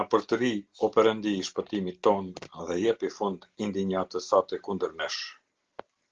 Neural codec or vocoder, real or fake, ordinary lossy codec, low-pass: none; real; Opus, 16 kbps; 7.2 kHz